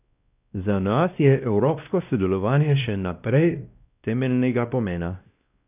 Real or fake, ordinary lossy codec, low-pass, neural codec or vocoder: fake; none; 3.6 kHz; codec, 16 kHz, 1 kbps, X-Codec, WavLM features, trained on Multilingual LibriSpeech